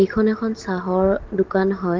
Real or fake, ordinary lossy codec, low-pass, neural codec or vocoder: real; Opus, 16 kbps; 7.2 kHz; none